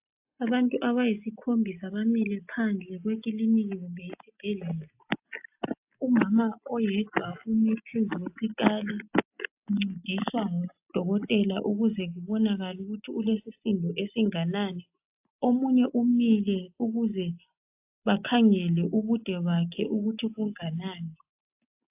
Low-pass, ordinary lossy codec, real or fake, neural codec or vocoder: 3.6 kHz; AAC, 32 kbps; real; none